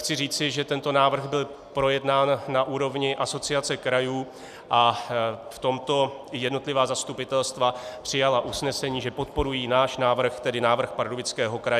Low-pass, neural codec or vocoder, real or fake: 14.4 kHz; none; real